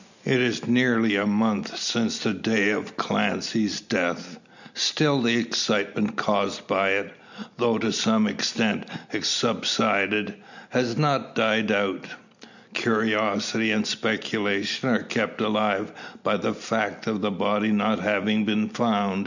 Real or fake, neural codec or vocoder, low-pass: real; none; 7.2 kHz